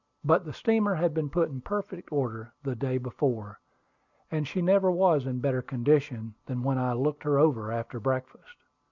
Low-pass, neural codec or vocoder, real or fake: 7.2 kHz; none; real